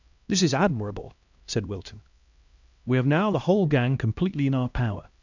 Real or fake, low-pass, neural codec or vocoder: fake; 7.2 kHz; codec, 16 kHz, 1 kbps, X-Codec, HuBERT features, trained on LibriSpeech